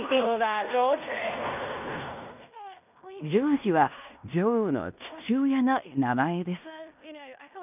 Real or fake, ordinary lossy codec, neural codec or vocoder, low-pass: fake; none; codec, 16 kHz in and 24 kHz out, 0.9 kbps, LongCat-Audio-Codec, fine tuned four codebook decoder; 3.6 kHz